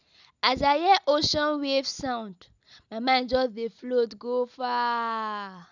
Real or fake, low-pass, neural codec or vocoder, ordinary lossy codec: real; 7.2 kHz; none; none